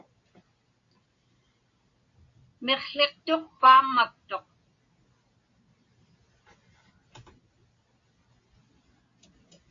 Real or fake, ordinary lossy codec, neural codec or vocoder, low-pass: real; AAC, 48 kbps; none; 7.2 kHz